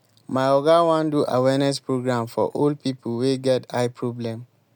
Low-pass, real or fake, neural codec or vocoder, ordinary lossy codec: none; real; none; none